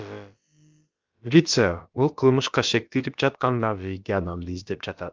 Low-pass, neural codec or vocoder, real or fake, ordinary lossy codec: 7.2 kHz; codec, 16 kHz, about 1 kbps, DyCAST, with the encoder's durations; fake; Opus, 32 kbps